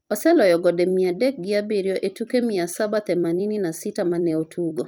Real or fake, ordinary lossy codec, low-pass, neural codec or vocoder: fake; none; none; vocoder, 44.1 kHz, 128 mel bands every 256 samples, BigVGAN v2